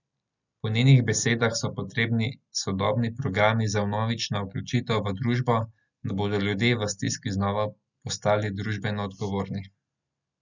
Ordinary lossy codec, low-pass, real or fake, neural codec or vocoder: none; 7.2 kHz; real; none